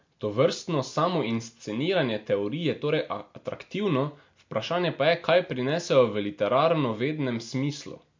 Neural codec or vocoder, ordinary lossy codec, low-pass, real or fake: none; MP3, 48 kbps; 7.2 kHz; real